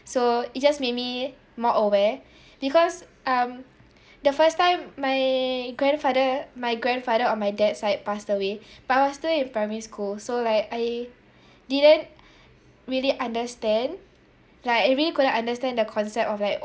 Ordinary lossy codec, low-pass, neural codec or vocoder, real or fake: none; none; none; real